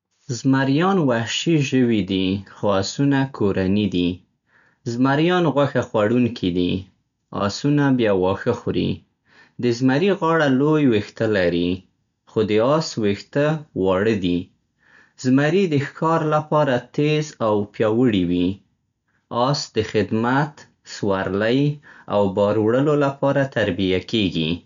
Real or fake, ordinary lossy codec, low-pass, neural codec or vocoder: real; none; 7.2 kHz; none